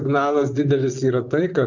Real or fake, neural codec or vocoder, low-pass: fake; vocoder, 44.1 kHz, 128 mel bands, Pupu-Vocoder; 7.2 kHz